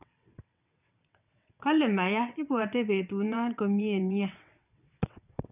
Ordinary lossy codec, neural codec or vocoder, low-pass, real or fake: none; vocoder, 22.05 kHz, 80 mel bands, WaveNeXt; 3.6 kHz; fake